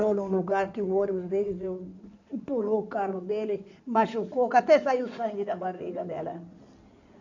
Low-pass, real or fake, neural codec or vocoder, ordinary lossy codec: 7.2 kHz; fake; codec, 16 kHz in and 24 kHz out, 2.2 kbps, FireRedTTS-2 codec; none